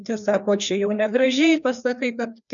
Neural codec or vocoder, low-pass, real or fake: codec, 16 kHz, 2 kbps, FreqCodec, larger model; 7.2 kHz; fake